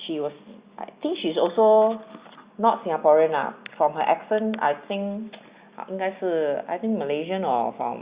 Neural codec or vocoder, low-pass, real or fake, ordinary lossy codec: none; 3.6 kHz; real; Opus, 64 kbps